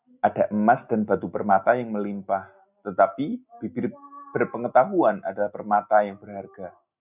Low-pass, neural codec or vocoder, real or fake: 3.6 kHz; none; real